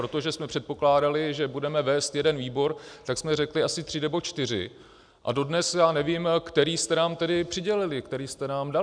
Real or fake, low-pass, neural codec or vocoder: real; 9.9 kHz; none